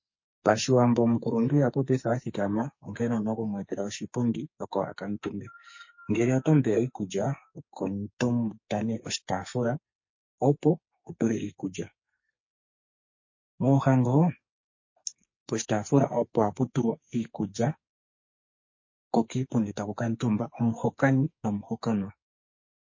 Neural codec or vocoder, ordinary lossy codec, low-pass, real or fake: codec, 44.1 kHz, 2.6 kbps, SNAC; MP3, 32 kbps; 7.2 kHz; fake